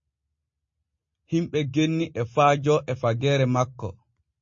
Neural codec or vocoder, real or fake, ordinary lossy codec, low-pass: none; real; MP3, 32 kbps; 7.2 kHz